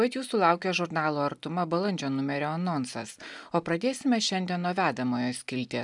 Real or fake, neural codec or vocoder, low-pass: real; none; 10.8 kHz